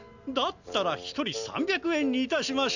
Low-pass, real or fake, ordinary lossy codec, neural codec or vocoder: 7.2 kHz; real; none; none